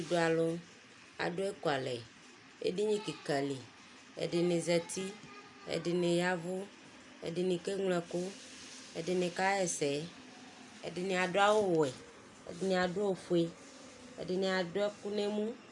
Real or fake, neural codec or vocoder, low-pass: real; none; 10.8 kHz